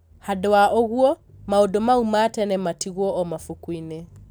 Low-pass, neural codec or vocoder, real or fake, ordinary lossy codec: none; none; real; none